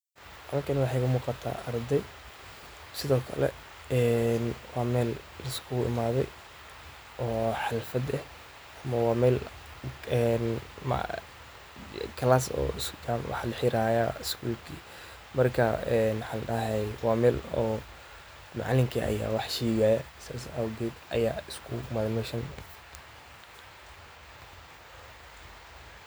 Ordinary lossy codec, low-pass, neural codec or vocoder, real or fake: none; none; none; real